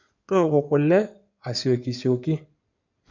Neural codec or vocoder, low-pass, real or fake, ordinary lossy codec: codec, 16 kHz in and 24 kHz out, 2.2 kbps, FireRedTTS-2 codec; 7.2 kHz; fake; none